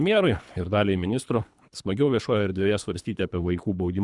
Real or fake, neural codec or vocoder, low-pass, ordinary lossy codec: fake; codec, 24 kHz, 3 kbps, HILCodec; 10.8 kHz; Opus, 64 kbps